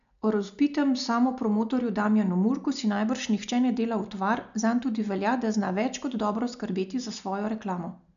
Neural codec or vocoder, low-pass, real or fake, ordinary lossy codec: none; 7.2 kHz; real; none